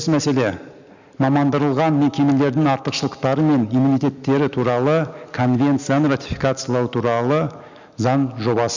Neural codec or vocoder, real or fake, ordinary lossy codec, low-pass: none; real; Opus, 64 kbps; 7.2 kHz